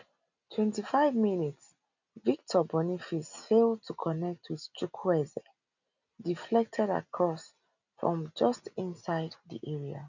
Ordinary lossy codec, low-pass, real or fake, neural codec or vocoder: none; 7.2 kHz; real; none